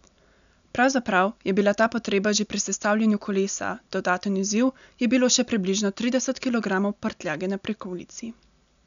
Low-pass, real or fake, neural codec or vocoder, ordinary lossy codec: 7.2 kHz; real; none; none